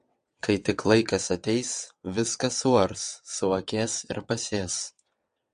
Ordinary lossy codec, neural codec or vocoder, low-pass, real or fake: MP3, 48 kbps; vocoder, 22.05 kHz, 80 mel bands, WaveNeXt; 9.9 kHz; fake